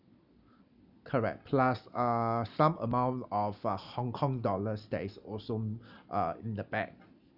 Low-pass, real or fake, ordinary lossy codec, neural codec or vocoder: 5.4 kHz; real; none; none